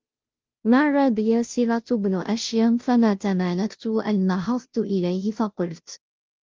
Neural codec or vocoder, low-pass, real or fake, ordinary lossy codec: codec, 16 kHz, 0.5 kbps, FunCodec, trained on Chinese and English, 25 frames a second; 7.2 kHz; fake; Opus, 32 kbps